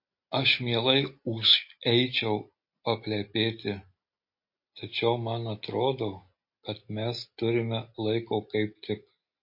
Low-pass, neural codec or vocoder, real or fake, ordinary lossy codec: 5.4 kHz; none; real; MP3, 32 kbps